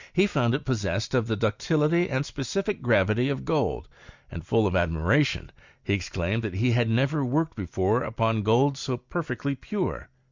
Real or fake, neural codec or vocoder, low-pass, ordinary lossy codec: real; none; 7.2 kHz; Opus, 64 kbps